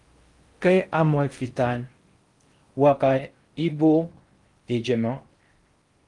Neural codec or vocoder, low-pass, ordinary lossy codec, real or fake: codec, 16 kHz in and 24 kHz out, 0.6 kbps, FocalCodec, streaming, 4096 codes; 10.8 kHz; Opus, 32 kbps; fake